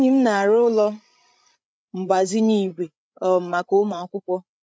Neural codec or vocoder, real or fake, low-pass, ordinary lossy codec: codec, 16 kHz, 8 kbps, FreqCodec, larger model; fake; none; none